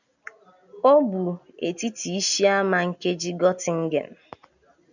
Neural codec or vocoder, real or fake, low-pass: none; real; 7.2 kHz